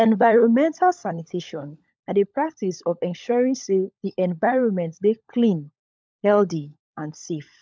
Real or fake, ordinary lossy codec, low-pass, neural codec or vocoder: fake; none; none; codec, 16 kHz, 16 kbps, FunCodec, trained on LibriTTS, 50 frames a second